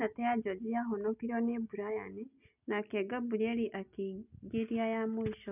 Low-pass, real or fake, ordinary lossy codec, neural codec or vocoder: 3.6 kHz; real; none; none